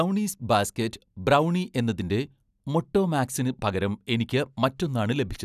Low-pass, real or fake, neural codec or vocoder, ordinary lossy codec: 14.4 kHz; real; none; none